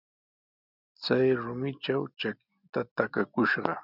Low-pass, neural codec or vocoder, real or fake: 5.4 kHz; none; real